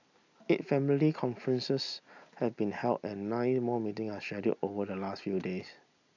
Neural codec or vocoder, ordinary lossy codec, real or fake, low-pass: none; none; real; 7.2 kHz